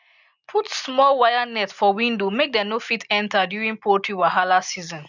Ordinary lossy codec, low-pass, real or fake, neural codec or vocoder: none; 7.2 kHz; real; none